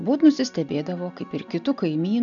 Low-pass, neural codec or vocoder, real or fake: 7.2 kHz; none; real